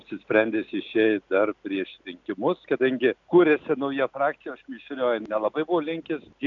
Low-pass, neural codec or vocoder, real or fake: 7.2 kHz; none; real